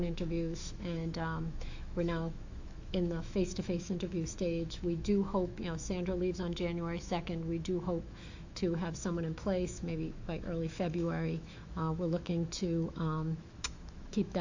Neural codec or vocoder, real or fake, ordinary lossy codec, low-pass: autoencoder, 48 kHz, 128 numbers a frame, DAC-VAE, trained on Japanese speech; fake; AAC, 48 kbps; 7.2 kHz